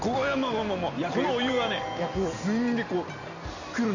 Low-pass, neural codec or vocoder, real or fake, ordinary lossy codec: 7.2 kHz; none; real; none